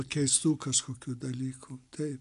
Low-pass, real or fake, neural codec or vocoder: 10.8 kHz; real; none